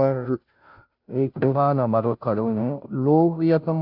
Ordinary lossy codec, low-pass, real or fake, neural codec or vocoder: AAC, 48 kbps; 5.4 kHz; fake; codec, 16 kHz, 0.5 kbps, FunCodec, trained on Chinese and English, 25 frames a second